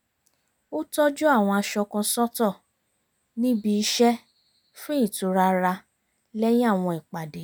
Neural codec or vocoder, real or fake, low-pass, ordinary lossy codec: none; real; none; none